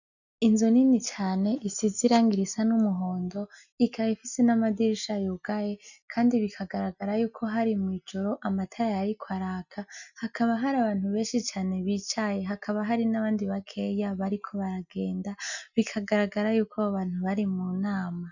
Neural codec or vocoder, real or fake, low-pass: none; real; 7.2 kHz